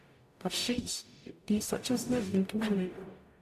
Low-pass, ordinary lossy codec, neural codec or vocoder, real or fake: 14.4 kHz; AAC, 96 kbps; codec, 44.1 kHz, 0.9 kbps, DAC; fake